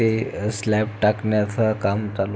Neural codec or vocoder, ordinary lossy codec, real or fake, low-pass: none; none; real; none